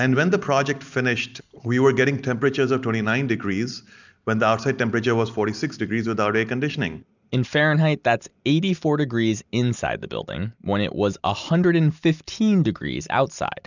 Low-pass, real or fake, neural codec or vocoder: 7.2 kHz; real; none